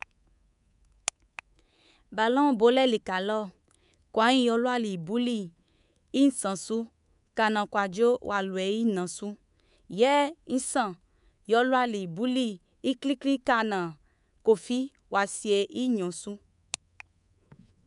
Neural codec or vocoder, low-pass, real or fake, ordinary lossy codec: codec, 24 kHz, 3.1 kbps, DualCodec; 10.8 kHz; fake; none